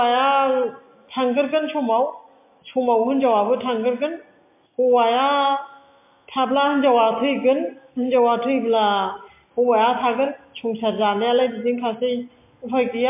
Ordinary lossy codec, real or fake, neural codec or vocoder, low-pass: MP3, 24 kbps; real; none; 3.6 kHz